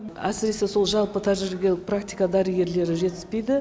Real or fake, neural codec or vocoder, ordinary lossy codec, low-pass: real; none; none; none